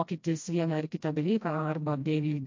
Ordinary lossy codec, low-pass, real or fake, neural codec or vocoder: MP3, 48 kbps; 7.2 kHz; fake; codec, 16 kHz, 1 kbps, FreqCodec, smaller model